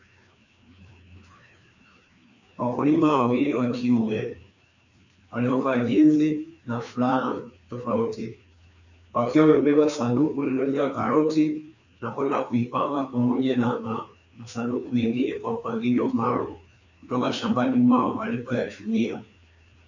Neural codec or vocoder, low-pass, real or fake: codec, 16 kHz, 2 kbps, FreqCodec, larger model; 7.2 kHz; fake